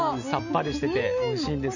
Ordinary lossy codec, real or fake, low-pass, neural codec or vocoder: none; real; 7.2 kHz; none